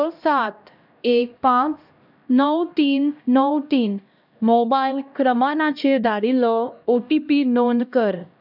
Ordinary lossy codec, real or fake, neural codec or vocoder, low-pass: none; fake; codec, 16 kHz, 1 kbps, X-Codec, HuBERT features, trained on LibriSpeech; 5.4 kHz